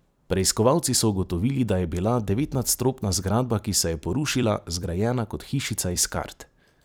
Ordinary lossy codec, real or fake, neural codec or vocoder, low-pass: none; fake; vocoder, 44.1 kHz, 128 mel bands every 256 samples, BigVGAN v2; none